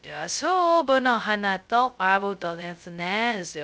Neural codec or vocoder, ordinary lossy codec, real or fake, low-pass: codec, 16 kHz, 0.2 kbps, FocalCodec; none; fake; none